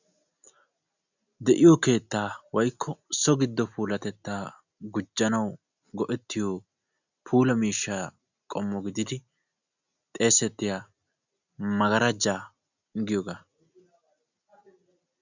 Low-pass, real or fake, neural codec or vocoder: 7.2 kHz; real; none